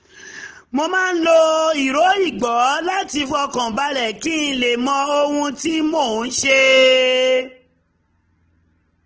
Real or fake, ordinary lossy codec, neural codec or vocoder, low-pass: real; Opus, 16 kbps; none; 7.2 kHz